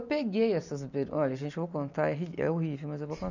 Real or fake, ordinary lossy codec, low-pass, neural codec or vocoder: real; none; 7.2 kHz; none